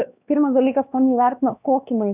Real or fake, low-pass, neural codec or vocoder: fake; 3.6 kHz; codec, 16 kHz, 2 kbps, X-Codec, WavLM features, trained on Multilingual LibriSpeech